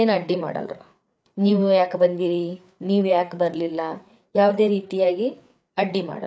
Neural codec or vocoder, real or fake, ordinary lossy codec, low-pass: codec, 16 kHz, 4 kbps, FreqCodec, larger model; fake; none; none